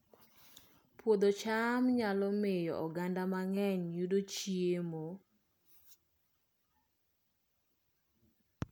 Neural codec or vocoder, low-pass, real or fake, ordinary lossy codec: none; none; real; none